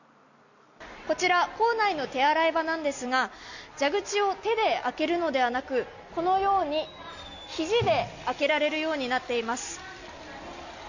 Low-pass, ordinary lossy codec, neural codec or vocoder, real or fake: 7.2 kHz; none; none; real